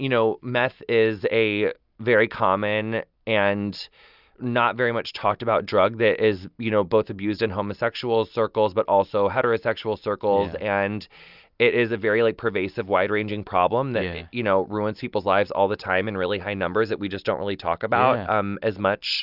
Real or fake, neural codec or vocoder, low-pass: real; none; 5.4 kHz